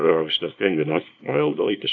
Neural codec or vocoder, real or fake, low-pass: codec, 24 kHz, 0.9 kbps, WavTokenizer, small release; fake; 7.2 kHz